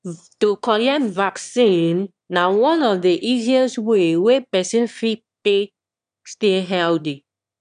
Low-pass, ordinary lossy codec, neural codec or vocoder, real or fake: 9.9 kHz; none; autoencoder, 22.05 kHz, a latent of 192 numbers a frame, VITS, trained on one speaker; fake